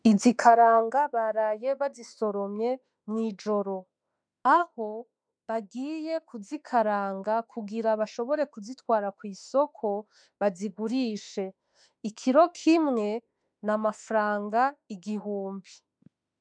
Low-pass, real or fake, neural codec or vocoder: 9.9 kHz; fake; autoencoder, 48 kHz, 32 numbers a frame, DAC-VAE, trained on Japanese speech